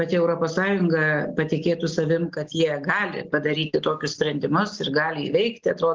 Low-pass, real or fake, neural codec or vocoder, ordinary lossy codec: 7.2 kHz; real; none; Opus, 16 kbps